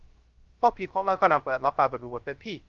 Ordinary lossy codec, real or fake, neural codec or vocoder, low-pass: Opus, 32 kbps; fake; codec, 16 kHz, 0.3 kbps, FocalCodec; 7.2 kHz